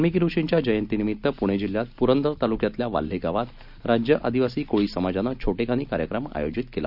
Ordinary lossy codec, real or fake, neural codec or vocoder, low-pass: none; real; none; 5.4 kHz